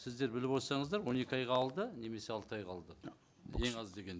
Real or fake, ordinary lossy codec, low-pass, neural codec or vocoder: real; none; none; none